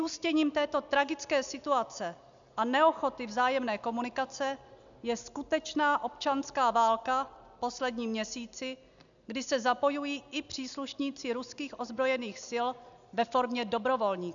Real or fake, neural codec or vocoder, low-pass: real; none; 7.2 kHz